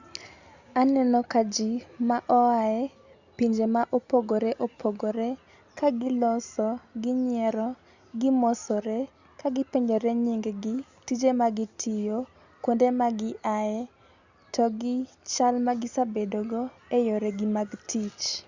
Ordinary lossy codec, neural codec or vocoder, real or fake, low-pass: Opus, 64 kbps; none; real; 7.2 kHz